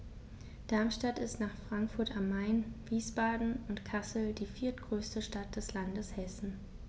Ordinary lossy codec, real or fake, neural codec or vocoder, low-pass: none; real; none; none